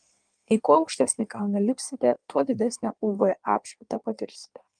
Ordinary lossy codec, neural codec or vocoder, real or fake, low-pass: Opus, 32 kbps; codec, 16 kHz in and 24 kHz out, 1.1 kbps, FireRedTTS-2 codec; fake; 9.9 kHz